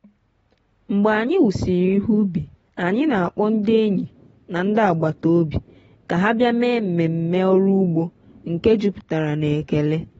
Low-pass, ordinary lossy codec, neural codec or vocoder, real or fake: 10.8 kHz; AAC, 24 kbps; none; real